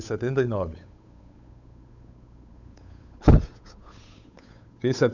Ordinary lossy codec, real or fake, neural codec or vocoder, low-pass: none; fake; codec, 16 kHz, 8 kbps, FunCodec, trained on Chinese and English, 25 frames a second; 7.2 kHz